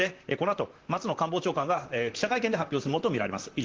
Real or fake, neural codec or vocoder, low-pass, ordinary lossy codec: fake; vocoder, 44.1 kHz, 128 mel bands, Pupu-Vocoder; 7.2 kHz; Opus, 16 kbps